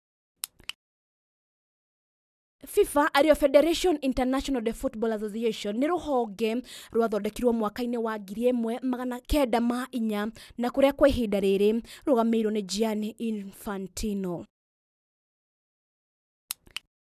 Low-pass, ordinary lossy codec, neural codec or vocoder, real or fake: 14.4 kHz; none; none; real